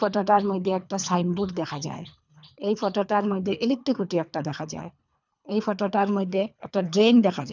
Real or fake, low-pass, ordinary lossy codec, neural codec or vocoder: fake; 7.2 kHz; none; codec, 24 kHz, 3 kbps, HILCodec